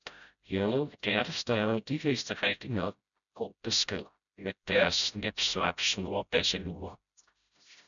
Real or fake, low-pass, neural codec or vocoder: fake; 7.2 kHz; codec, 16 kHz, 0.5 kbps, FreqCodec, smaller model